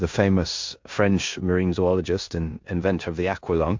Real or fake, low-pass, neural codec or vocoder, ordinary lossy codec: fake; 7.2 kHz; codec, 16 kHz in and 24 kHz out, 0.9 kbps, LongCat-Audio-Codec, four codebook decoder; MP3, 48 kbps